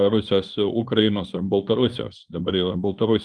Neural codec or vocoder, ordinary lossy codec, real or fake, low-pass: codec, 24 kHz, 0.9 kbps, WavTokenizer, small release; Opus, 32 kbps; fake; 9.9 kHz